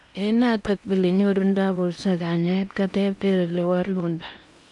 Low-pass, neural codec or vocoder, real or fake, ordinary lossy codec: 10.8 kHz; codec, 16 kHz in and 24 kHz out, 0.8 kbps, FocalCodec, streaming, 65536 codes; fake; none